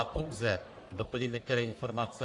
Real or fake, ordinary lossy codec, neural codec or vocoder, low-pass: fake; AAC, 48 kbps; codec, 44.1 kHz, 1.7 kbps, Pupu-Codec; 10.8 kHz